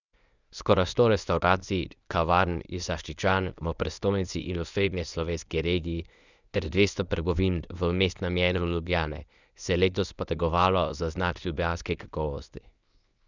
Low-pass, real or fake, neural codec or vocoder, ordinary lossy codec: 7.2 kHz; fake; codec, 24 kHz, 0.9 kbps, WavTokenizer, medium speech release version 1; none